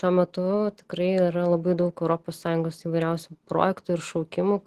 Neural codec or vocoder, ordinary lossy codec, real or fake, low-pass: none; Opus, 16 kbps; real; 14.4 kHz